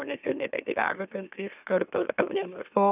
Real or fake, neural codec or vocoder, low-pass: fake; autoencoder, 44.1 kHz, a latent of 192 numbers a frame, MeloTTS; 3.6 kHz